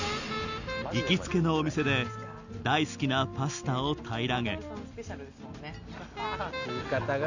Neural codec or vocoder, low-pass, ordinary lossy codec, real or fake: none; 7.2 kHz; none; real